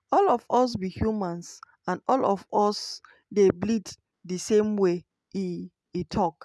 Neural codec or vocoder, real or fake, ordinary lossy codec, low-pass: none; real; none; none